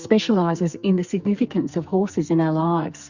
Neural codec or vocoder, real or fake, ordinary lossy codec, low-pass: codec, 44.1 kHz, 2.6 kbps, SNAC; fake; Opus, 64 kbps; 7.2 kHz